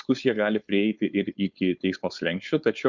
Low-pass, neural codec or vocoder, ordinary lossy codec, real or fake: 7.2 kHz; codec, 16 kHz, 4.8 kbps, FACodec; Opus, 64 kbps; fake